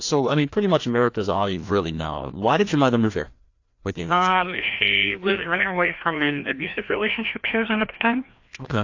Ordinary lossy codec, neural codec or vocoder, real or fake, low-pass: AAC, 48 kbps; codec, 16 kHz, 1 kbps, FreqCodec, larger model; fake; 7.2 kHz